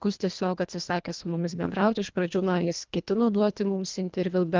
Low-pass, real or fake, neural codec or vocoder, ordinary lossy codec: 7.2 kHz; fake; codec, 24 kHz, 1.5 kbps, HILCodec; Opus, 32 kbps